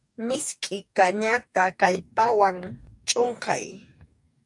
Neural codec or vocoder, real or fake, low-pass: codec, 44.1 kHz, 2.6 kbps, DAC; fake; 10.8 kHz